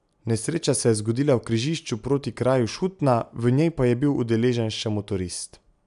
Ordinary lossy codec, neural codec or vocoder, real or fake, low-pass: none; none; real; 10.8 kHz